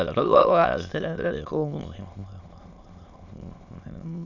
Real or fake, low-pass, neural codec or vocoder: fake; 7.2 kHz; autoencoder, 22.05 kHz, a latent of 192 numbers a frame, VITS, trained on many speakers